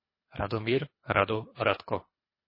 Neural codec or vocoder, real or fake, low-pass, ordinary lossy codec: codec, 24 kHz, 3 kbps, HILCodec; fake; 5.4 kHz; MP3, 24 kbps